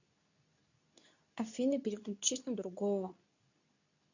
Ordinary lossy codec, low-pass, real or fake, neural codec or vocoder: none; 7.2 kHz; fake; codec, 24 kHz, 0.9 kbps, WavTokenizer, medium speech release version 2